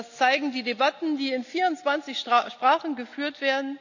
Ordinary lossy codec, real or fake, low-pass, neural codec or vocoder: none; real; 7.2 kHz; none